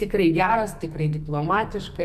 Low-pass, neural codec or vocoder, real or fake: 14.4 kHz; codec, 44.1 kHz, 2.6 kbps, SNAC; fake